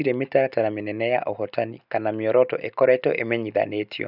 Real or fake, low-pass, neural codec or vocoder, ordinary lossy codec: real; 5.4 kHz; none; none